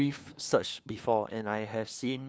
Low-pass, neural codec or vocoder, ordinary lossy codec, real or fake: none; codec, 16 kHz, 2 kbps, FunCodec, trained on LibriTTS, 25 frames a second; none; fake